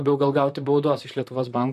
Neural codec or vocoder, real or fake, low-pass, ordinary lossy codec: vocoder, 44.1 kHz, 128 mel bands, Pupu-Vocoder; fake; 14.4 kHz; MP3, 64 kbps